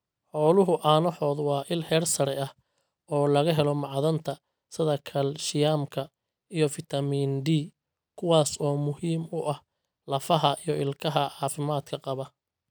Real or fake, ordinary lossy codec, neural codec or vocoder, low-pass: real; none; none; none